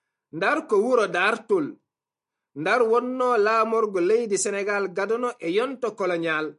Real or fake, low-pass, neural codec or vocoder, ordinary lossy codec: real; 10.8 kHz; none; MP3, 48 kbps